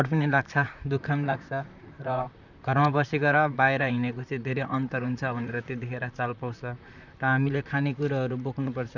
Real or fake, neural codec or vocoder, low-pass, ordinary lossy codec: fake; vocoder, 44.1 kHz, 128 mel bands, Pupu-Vocoder; 7.2 kHz; none